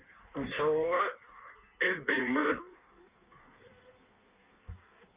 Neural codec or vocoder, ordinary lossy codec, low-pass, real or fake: codec, 16 kHz in and 24 kHz out, 1.1 kbps, FireRedTTS-2 codec; Opus, 64 kbps; 3.6 kHz; fake